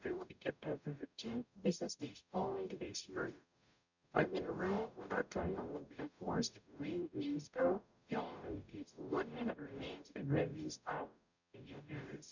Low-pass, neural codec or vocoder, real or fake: 7.2 kHz; codec, 44.1 kHz, 0.9 kbps, DAC; fake